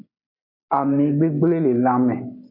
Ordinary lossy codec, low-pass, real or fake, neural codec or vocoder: MP3, 32 kbps; 5.4 kHz; real; none